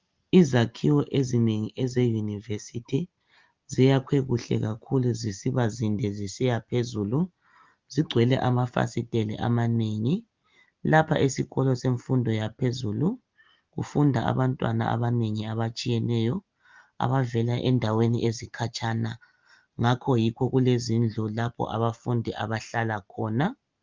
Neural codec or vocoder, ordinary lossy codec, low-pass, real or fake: none; Opus, 32 kbps; 7.2 kHz; real